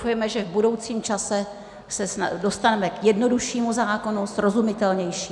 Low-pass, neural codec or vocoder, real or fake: 10.8 kHz; none; real